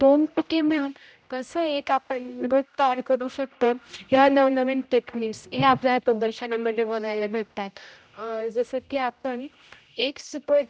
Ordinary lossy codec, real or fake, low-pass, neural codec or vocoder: none; fake; none; codec, 16 kHz, 0.5 kbps, X-Codec, HuBERT features, trained on general audio